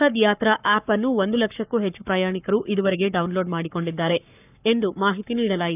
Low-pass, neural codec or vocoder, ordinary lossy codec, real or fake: 3.6 kHz; codec, 44.1 kHz, 7.8 kbps, Pupu-Codec; none; fake